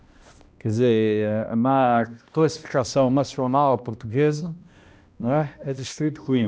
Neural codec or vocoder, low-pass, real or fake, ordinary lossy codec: codec, 16 kHz, 1 kbps, X-Codec, HuBERT features, trained on balanced general audio; none; fake; none